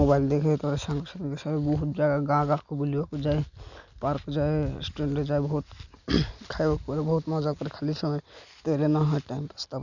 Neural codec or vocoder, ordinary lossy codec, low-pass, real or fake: none; none; 7.2 kHz; real